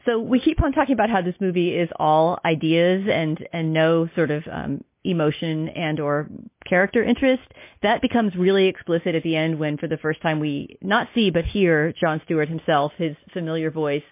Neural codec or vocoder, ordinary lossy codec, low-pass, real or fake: none; MP3, 24 kbps; 3.6 kHz; real